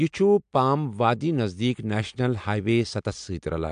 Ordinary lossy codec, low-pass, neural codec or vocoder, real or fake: MP3, 64 kbps; 9.9 kHz; none; real